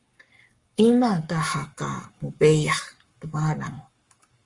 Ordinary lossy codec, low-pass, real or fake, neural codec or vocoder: Opus, 32 kbps; 10.8 kHz; fake; codec, 44.1 kHz, 7.8 kbps, Pupu-Codec